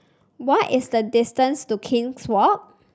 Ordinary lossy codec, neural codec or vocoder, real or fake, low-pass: none; none; real; none